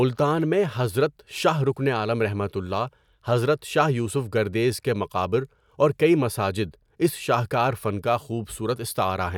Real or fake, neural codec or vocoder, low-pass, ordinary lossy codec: real; none; 19.8 kHz; none